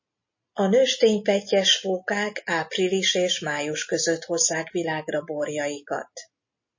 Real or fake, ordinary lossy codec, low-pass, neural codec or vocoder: real; MP3, 32 kbps; 7.2 kHz; none